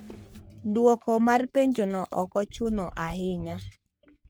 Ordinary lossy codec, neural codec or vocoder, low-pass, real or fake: none; codec, 44.1 kHz, 3.4 kbps, Pupu-Codec; none; fake